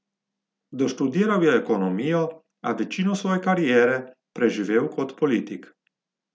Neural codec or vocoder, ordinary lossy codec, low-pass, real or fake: none; none; none; real